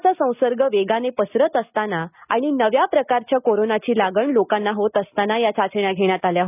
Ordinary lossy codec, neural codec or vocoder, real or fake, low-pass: none; none; real; 3.6 kHz